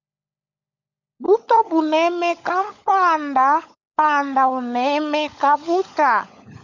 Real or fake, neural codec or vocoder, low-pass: fake; codec, 16 kHz, 16 kbps, FunCodec, trained on LibriTTS, 50 frames a second; 7.2 kHz